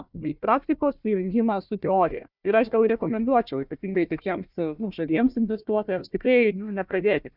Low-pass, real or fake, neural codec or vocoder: 5.4 kHz; fake; codec, 16 kHz, 1 kbps, FunCodec, trained on Chinese and English, 50 frames a second